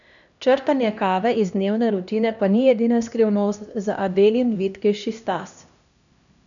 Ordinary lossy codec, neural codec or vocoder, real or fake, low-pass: none; codec, 16 kHz, 1 kbps, X-Codec, HuBERT features, trained on LibriSpeech; fake; 7.2 kHz